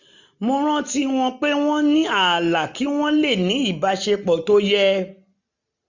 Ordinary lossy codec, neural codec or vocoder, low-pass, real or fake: none; none; 7.2 kHz; real